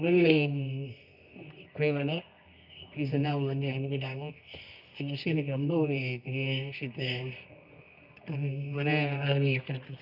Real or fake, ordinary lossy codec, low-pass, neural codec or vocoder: fake; none; 5.4 kHz; codec, 24 kHz, 0.9 kbps, WavTokenizer, medium music audio release